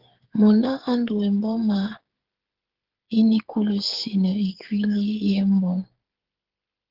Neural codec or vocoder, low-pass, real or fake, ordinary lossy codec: codec, 16 kHz, 8 kbps, FreqCodec, smaller model; 5.4 kHz; fake; Opus, 16 kbps